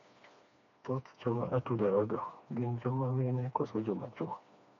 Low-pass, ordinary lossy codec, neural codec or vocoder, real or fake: 7.2 kHz; MP3, 64 kbps; codec, 16 kHz, 2 kbps, FreqCodec, smaller model; fake